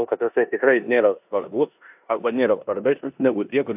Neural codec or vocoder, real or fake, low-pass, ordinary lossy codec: codec, 16 kHz in and 24 kHz out, 0.9 kbps, LongCat-Audio-Codec, four codebook decoder; fake; 3.6 kHz; AAC, 32 kbps